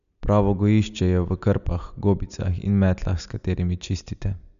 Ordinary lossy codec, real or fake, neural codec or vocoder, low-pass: none; real; none; 7.2 kHz